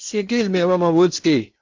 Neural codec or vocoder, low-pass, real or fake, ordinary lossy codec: codec, 16 kHz in and 24 kHz out, 0.8 kbps, FocalCodec, streaming, 65536 codes; 7.2 kHz; fake; MP3, 64 kbps